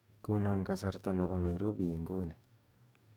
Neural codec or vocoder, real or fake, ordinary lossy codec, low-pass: codec, 44.1 kHz, 2.6 kbps, DAC; fake; none; 19.8 kHz